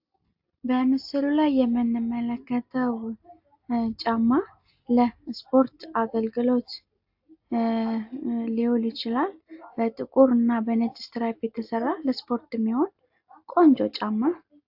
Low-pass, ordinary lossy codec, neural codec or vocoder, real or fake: 5.4 kHz; AAC, 48 kbps; none; real